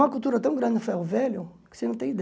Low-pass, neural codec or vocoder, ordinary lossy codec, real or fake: none; none; none; real